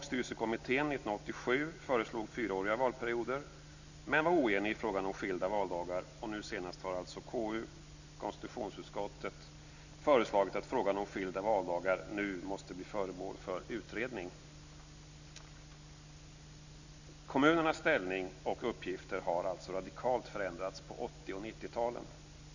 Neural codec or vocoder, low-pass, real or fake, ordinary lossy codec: none; 7.2 kHz; real; none